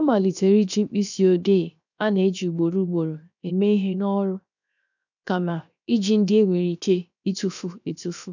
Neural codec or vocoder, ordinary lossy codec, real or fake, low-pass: codec, 16 kHz, 0.7 kbps, FocalCodec; none; fake; 7.2 kHz